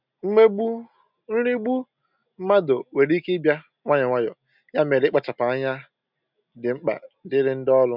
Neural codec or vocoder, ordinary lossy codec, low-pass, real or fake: none; none; 5.4 kHz; real